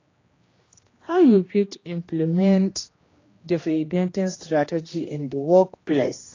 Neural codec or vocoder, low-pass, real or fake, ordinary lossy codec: codec, 16 kHz, 1 kbps, X-Codec, HuBERT features, trained on general audio; 7.2 kHz; fake; AAC, 32 kbps